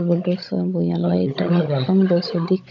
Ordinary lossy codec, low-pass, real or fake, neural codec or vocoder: none; 7.2 kHz; fake; codec, 16 kHz, 16 kbps, FunCodec, trained on Chinese and English, 50 frames a second